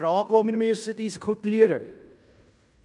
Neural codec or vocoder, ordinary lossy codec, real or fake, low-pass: codec, 16 kHz in and 24 kHz out, 0.9 kbps, LongCat-Audio-Codec, fine tuned four codebook decoder; none; fake; 10.8 kHz